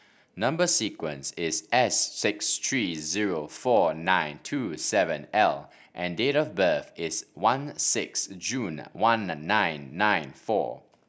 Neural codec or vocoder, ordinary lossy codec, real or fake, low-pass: none; none; real; none